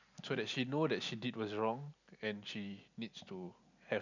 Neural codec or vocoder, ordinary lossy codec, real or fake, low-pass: none; none; real; 7.2 kHz